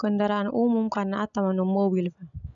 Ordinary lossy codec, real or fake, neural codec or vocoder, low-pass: none; real; none; 7.2 kHz